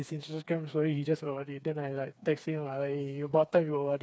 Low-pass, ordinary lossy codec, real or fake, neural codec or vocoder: none; none; fake; codec, 16 kHz, 4 kbps, FreqCodec, smaller model